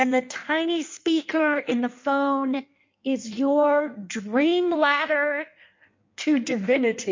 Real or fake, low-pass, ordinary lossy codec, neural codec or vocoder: fake; 7.2 kHz; MP3, 48 kbps; codec, 16 kHz in and 24 kHz out, 1.1 kbps, FireRedTTS-2 codec